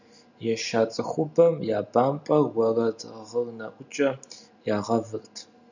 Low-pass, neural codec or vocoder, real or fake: 7.2 kHz; none; real